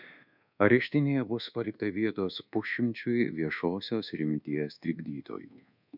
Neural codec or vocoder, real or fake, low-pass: codec, 24 kHz, 1.2 kbps, DualCodec; fake; 5.4 kHz